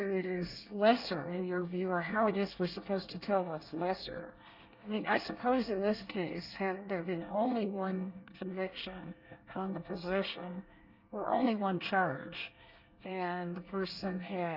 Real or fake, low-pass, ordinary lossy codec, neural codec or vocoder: fake; 5.4 kHz; Opus, 64 kbps; codec, 24 kHz, 1 kbps, SNAC